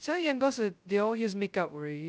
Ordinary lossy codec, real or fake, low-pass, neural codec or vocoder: none; fake; none; codec, 16 kHz, 0.2 kbps, FocalCodec